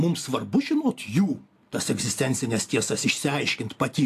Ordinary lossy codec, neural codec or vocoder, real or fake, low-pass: AAC, 64 kbps; none; real; 14.4 kHz